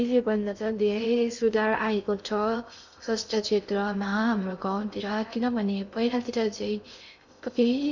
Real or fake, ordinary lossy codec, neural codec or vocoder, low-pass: fake; none; codec, 16 kHz in and 24 kHz out, 0.6 kbps, FocalCodec, streaming, 2048 codes; 7.2 kHz